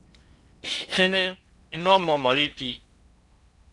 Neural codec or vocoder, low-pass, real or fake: codec, 16 kHz in and 24 kHz out, 0.8 kbps, FocalCodec, streaming, 65536 codes; 10.8 kHz; fake